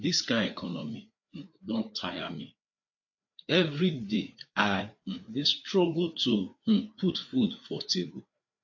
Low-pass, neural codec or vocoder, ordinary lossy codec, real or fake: 7.2 kHz; codec, 16 kHz, 4 kbps, FreqCodec, larger model; MP3, 48 kbps; fake